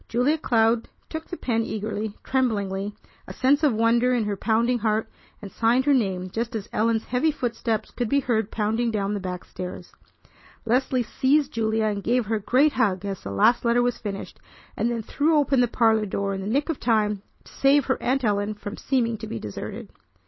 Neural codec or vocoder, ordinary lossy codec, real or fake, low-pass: none; MP3, 24 kbps; real; 7.2 kHz